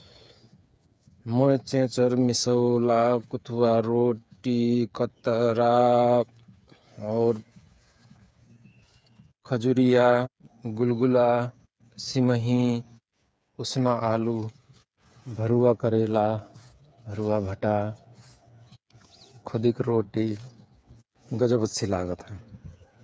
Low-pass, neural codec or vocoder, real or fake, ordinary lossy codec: none; codec, 16 kHz, 8 kbps, FreqCodec, smaller model; fake; none